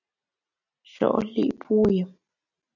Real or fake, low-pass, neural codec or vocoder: real; 7.2 kHz; none